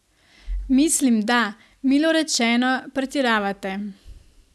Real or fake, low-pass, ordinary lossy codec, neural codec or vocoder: real; none; none; none